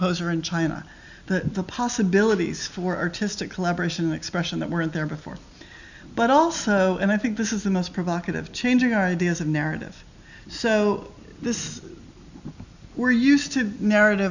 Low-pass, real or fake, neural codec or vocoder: 7.2 kHz; real; none